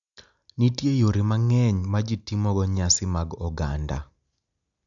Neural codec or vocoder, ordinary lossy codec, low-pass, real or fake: none; MP3, 96 kbps; 7.2 kHz; real